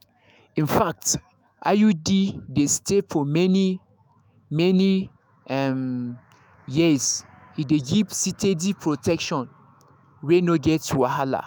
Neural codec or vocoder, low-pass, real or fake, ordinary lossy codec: autoencoder, 48 kHz, 128 numbers a frame, DAC-VAE, trained on Japanese speech; none; fake; none